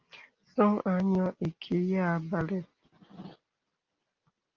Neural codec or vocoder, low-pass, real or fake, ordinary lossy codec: none; 7.2 kHz; real; Opus, 32 kbps